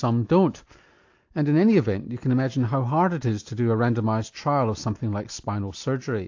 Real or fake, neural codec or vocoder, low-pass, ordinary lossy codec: real; none; 7.2 kHz; AAC, 48 kbps